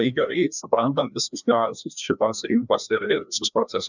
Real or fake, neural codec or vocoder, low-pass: fake; codec, 16 kHz, 1 kbps, FreqCodec, larger model; 7.2 kHz